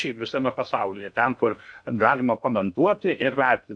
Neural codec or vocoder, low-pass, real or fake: codec, 16 kHz in and 24 kHz out, 0.6 kbps, FocalCodec, streaming, 2048 codes; 9.9 kHz; fake